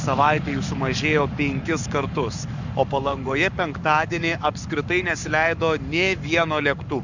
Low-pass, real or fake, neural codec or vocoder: 7.2 kHz; fake; codec, 44.1 kHz, 7.8 kbps, Pupu-Codec